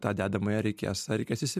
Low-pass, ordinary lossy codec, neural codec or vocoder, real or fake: 14.4 kHz; MP3, 96 kbps; none; real